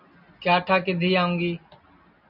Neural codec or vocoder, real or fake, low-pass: none; real; 5.4 kHz